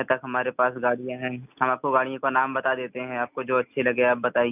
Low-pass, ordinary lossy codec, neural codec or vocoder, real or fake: 3.6 kHz; none; none; real